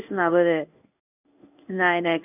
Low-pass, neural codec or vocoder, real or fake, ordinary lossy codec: 3.6 kHz; codec, 16 kHz in and 24 kHz out, 1 kbps, XY-Tokenizer; fake; none